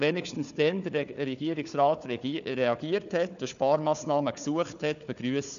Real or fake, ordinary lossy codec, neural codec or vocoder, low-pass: fake; none; codec, 16 kHz, 4 kbps, FunCodec, trained on Chinese and English, 50 frames a second; 7.2 kHz